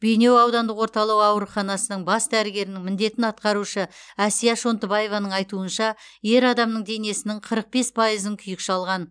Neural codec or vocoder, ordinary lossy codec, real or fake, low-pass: none; none; real; 9.9 kHz